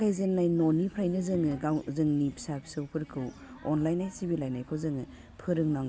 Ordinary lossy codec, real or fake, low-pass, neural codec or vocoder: none; real; none; none